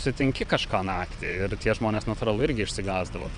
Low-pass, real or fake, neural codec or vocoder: 9.9 kHz; fake; vocoder, 22.05 kHz, 80 mel bands, WaveNeXt